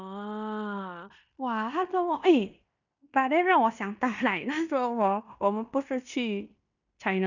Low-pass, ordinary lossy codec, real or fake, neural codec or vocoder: 7.2 kHz; none; fake; codec, 16 kHz in and 24 kHz out, 0.9 kbps, LongCat-Audio-Codec, fine tuned four codebook decoder